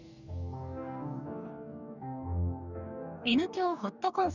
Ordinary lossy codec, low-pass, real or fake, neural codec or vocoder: none; 7.2 kHz; fake; codec, 44.1 kHz, 2.6 kbps, DAC